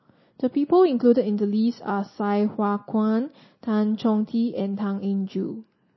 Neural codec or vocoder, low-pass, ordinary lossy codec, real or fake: none; 7.2 kHz; MP3, 24 kbps; real